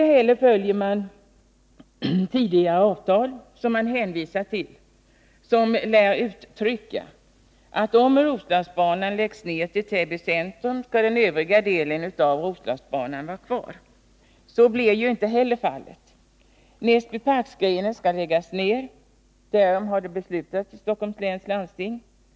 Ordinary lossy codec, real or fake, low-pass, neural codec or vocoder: none; real; none; none